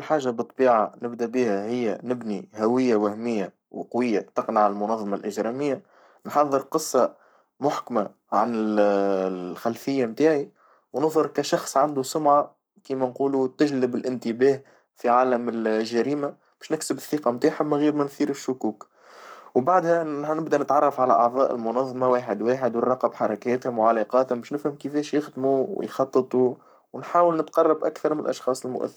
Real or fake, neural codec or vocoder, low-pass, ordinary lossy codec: fake; codec, 44.1 kHz, 7.8 kbps, Pupu-Codec; none; none